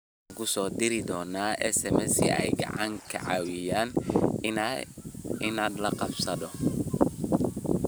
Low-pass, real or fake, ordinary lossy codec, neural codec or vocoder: none; fake; none; vocoder, 44.1 kHz, 128 mel bands every 512 samples, BigVGAN v2